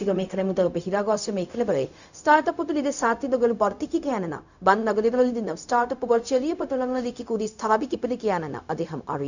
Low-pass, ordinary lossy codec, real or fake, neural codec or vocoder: 7.2 kHz; none; fake; codec, 16 kHz, 0.4 kbps, LongCat-Audio-Codec